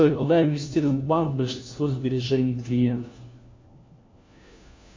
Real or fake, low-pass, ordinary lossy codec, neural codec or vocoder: fake; 7.2 kHz; MP3, 48 kbps; codec, 16 kHz, 1 kbps, FunCodec, trained on LibriTTS, 50 frames a second